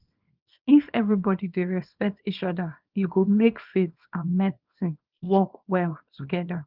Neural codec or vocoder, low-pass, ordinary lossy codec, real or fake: codec, 24 kHz, 0.9 kbps, WavTokenizer, small release; 5.4 kHz; Opus, 32 kbps; fake